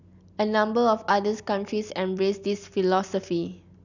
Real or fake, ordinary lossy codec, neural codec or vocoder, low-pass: real; none; none; 7.2 kHz